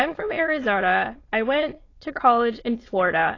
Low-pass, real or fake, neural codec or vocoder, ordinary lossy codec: 7.2 kHz; fake; autoencoder, 22.05 kHz, a latent of 192 numbers a frame, VITS, trained on many speakers; AAC, 32 kbps